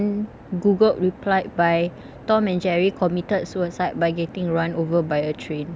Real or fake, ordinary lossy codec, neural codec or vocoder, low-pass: real; none; none; none